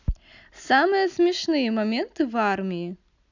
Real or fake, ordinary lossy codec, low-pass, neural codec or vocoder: real; none; 7.2 kHz; none